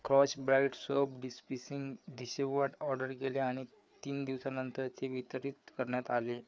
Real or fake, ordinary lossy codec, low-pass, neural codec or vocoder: fake; none; none; codec, 16 kHz, 4 kbps, FreqCodec, larger model